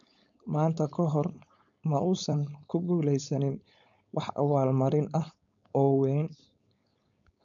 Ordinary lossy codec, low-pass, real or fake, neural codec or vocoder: none; 7.2 kHz; fake; codec, 16 kHz, 4.8 kbps, FACodec